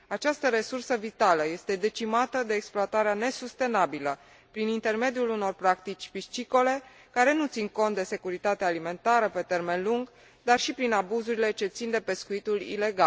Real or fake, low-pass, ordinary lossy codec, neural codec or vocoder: real; none; none; none